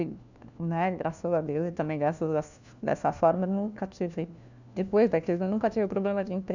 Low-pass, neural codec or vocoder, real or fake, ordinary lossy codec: 7.2 kHz; codec, 16 kHz, 1 kbps, FunCodec, trained on LibriTTS, 50 frames a second; fake; none